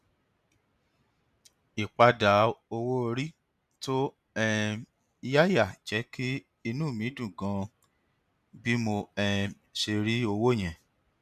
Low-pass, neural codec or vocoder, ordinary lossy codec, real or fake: 14.4 kHz; none; none; real